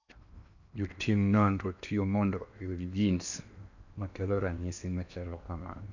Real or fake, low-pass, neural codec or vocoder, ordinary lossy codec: fake; 7.2 kHz; codec, 16 kHz in and 24 kHz out, 0.8 kbps, FocalCodec, streaming, 65536 codes; none